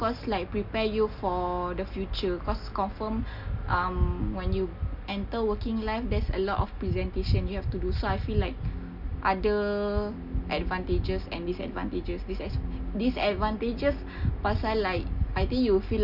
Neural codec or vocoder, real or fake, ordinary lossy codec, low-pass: none; real; none; 5.4 kHz